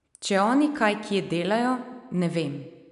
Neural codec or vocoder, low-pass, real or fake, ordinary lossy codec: none; 10.8 kHz; real; none